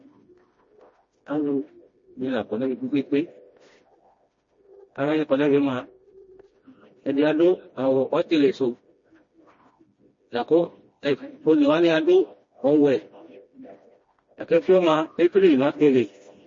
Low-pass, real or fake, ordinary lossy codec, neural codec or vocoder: 7.2 kHz; fake; MP3, 32 kbps; codec, 16 kHz, 1 kbps, FreqCodec, smaller model